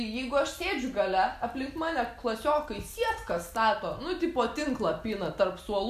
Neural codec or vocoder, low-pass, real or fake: none; 14.4 kHz; real